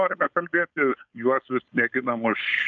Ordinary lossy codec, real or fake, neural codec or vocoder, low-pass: AAC, 64 kbps; fake; codec, 16 kHz, 4.8 kbps, FACodec; 7.2 kHz